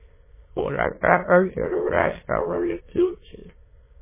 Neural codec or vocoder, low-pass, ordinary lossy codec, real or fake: autoencoder, 22.05 kHz, a latent of 192 numbers a frame, VITS, trained on many speakers; 3.6 kHz; MP3, 16 kbps; fake